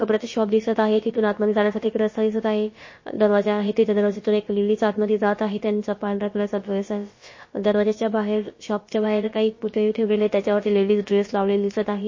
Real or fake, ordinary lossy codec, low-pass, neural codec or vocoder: fake; MP3, 32 kbps; 7.2 kHz; codec, 16 kHz, about 1 kbps, DyCAST, with the encoder's durations